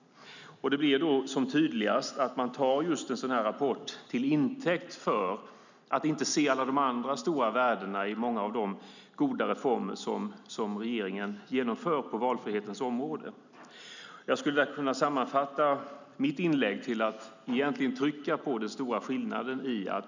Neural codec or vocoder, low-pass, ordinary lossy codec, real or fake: none; 7.2 kHz; none; real